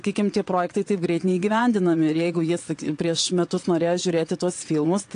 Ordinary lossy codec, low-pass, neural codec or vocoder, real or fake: AAC, 48 kbps; 9.9 kHz; vocoder, 22.05 kHz, 80 mel bands, WaveNeXt; fake